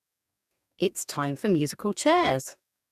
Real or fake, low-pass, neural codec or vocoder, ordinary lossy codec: fake; 14.4 kHz; codec, 44.1 kHz, 2.6 kbps, DAC; none